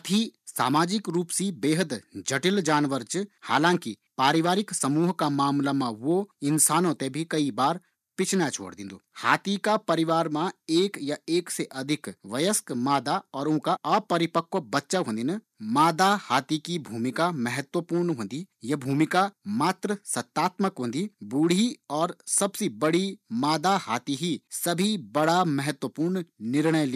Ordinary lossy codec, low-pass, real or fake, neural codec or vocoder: none; 14.4 kHz; real; none